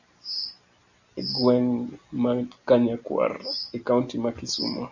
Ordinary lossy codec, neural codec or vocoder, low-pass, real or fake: Opus, 64 kbps; none; 7.2 kHz; real